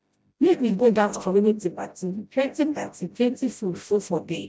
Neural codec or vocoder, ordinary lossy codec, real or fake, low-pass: codec, 16 kHz, 0.5 kbps, FreqCodec, smaller model; none; fake; none